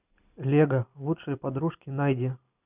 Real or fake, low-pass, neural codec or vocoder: real; 3.6 kHz; none